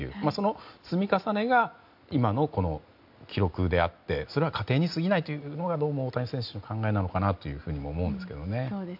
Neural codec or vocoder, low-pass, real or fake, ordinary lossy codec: none; 5.4 kHz; real; MP3, 48 kbps